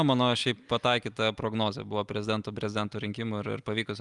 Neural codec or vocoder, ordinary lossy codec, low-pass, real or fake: none; Opus, 32 kbps; 10.8 kHz; real